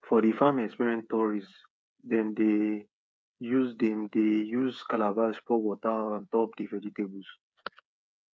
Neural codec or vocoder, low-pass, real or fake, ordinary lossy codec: codec, 16 kHz, 8 kbps, FreqCodec, smaller model; none; fake; none